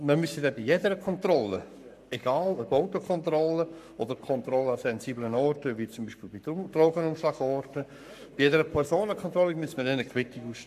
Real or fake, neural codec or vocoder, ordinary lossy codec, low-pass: fake; codec, 44.1 kHz, 7.8 kbps, Pupu-Codec; MP3, 96 kbps; 14.4 kHz